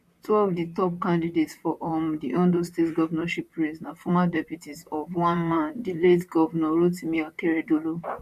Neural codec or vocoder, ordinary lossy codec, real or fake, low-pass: vocoder, 44.1 kHz, 128 mel bands, Pupu-Vocoder; MP3, 64 kbps; fake; 14.4 kHz